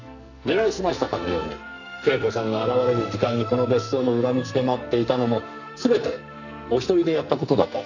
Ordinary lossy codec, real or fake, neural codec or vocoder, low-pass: none; fake; codec, 44.1 kHz, 2.6 kbps, SNAC; 7.2 kHz